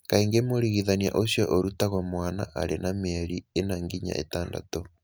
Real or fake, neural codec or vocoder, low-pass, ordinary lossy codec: real; none; none; none